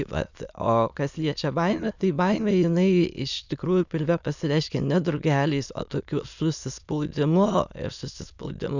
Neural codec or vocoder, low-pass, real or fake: autoencoder, 22.05 kHz, a latent of 192 numbers a frame, VITS, trained on many speakers; 7.2 kHz; fake